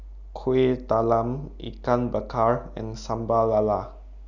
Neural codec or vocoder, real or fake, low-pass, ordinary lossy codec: none; real; 7.2 kHz; none